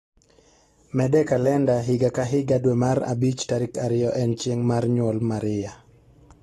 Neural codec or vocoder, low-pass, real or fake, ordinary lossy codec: none; 19.8 kHz; real; AAC, 32 kbps